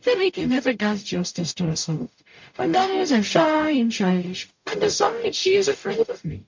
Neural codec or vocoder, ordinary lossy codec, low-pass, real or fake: codec, 44.1 kHz, 0.9 kbps, DAC; MP3, 48 kbps; 7.2 kHz; fake